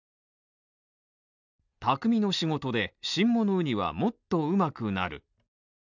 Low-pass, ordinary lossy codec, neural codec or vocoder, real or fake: 7.2 kHz; none; none; real